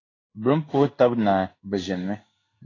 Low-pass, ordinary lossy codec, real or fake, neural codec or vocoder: 7.2 kHz; AAC, 32 kbps; fake; codec, 16 kHz in and 24 kHz out, 1 kbps, XY-Tokenizer